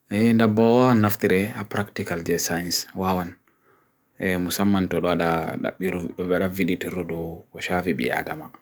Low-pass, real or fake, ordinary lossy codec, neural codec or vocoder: none; fake; none; codec, 44.1 kHz, 7.8 kbps, DAC